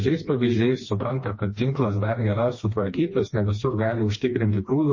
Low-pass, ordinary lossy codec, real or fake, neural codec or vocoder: 7.2 kHz; MP3, 32 kbps; fake; codec, 16 kHz, 2 kbps, FreqCodec, smaller model